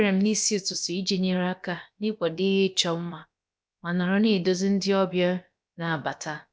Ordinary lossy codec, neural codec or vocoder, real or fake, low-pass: none; codec, 16 kHz, about 1 kbps, DyCAST, with the encoder's durations; fake; none